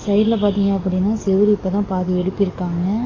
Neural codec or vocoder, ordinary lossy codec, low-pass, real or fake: codec, 44.1 kHz, 7.8 kbps, DAC; AAC, 32 kbps; 7.2 kHz; fake